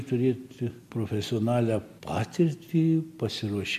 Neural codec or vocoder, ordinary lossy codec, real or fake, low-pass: none; MP3, 64 kbps; real; 14.4 kHz